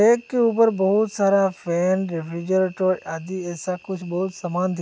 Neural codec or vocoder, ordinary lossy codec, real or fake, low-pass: none; none; real; none